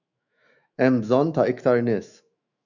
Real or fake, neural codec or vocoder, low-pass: fake; autoencoder, 48 kHz, 128 numbers a frame, DAC-VAE, trained on Japanese speech; 7.2 kHz